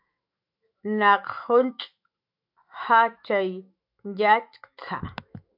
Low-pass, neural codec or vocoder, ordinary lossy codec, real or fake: 5.4 kHz; autoencoder, 48 kHz, 128 numbers a frame, DAC-VAE, trained on Japanese speech; AAC, 48 kbps; fake